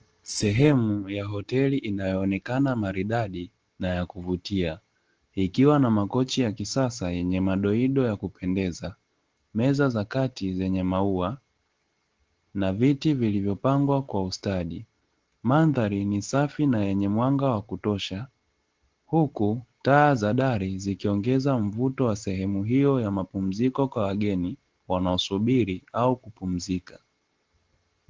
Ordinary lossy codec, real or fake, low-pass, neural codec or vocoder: Opus, 16 kbps; real; 7.2 kHz; none